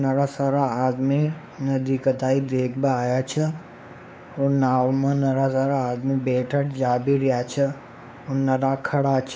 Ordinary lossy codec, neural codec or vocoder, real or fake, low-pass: none; codec, 16 kHz, 4 kbps, X-Codec, WavLM features, trained on Multilingual LibriSpeech; fake; none